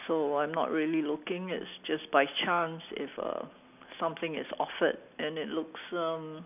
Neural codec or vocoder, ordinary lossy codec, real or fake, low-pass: none; none; real; 3.6 kHz